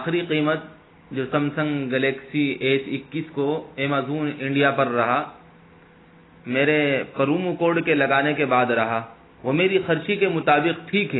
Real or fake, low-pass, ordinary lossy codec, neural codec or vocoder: real; 7.2 kHz; AAC, 16 kbps; none